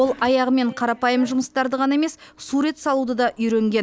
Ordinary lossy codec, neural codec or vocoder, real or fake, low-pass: none; none; real; none